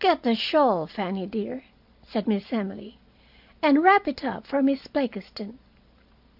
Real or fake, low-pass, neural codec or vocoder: real; 5.4 kHz; none